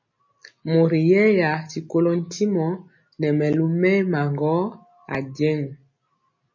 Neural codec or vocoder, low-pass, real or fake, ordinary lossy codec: none; 7.2 kHz; real; MP3, 32 kbps